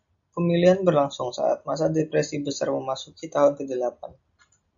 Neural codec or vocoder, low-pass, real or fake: none; 7.2 kHz; real